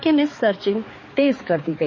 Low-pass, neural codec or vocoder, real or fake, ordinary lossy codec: 7.2 kHz; codec, 16 kHz, 4 kbps, X-Codec, HuBERT features, trained on balanced general audio; fake; MP3, 32 kbps